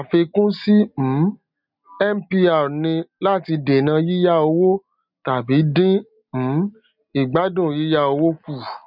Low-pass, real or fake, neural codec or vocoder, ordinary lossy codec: 5.4 kHz; real; none; none